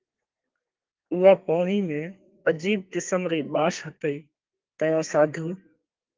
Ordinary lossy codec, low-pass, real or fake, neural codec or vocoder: Opus, 32 kbps; 7.2 kHz; fake; codec, 32 kHz, 1.9 kbps, SNAC